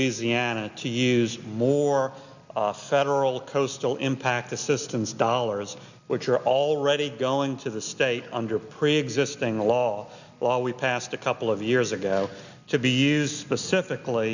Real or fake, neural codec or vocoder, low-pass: real; none; 7.2 kHz